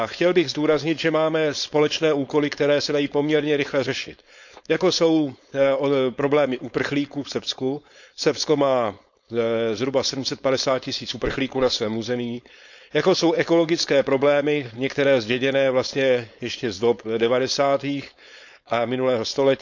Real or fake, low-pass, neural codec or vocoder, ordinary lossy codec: fake; 7.2 kHz; codec, 16 kHz, 4.8 kbps, FACodec; none